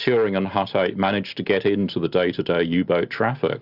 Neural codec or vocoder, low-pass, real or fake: none; 5.4 kHz; real